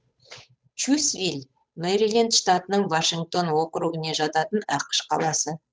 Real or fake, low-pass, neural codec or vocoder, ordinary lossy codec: fake; none; codec, 16 kHz, 8 kbps, FunCodec, trained on Chinese and English, 25 frames a second; none